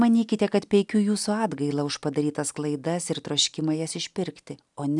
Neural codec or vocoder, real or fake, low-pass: none; real; 10.8 kHz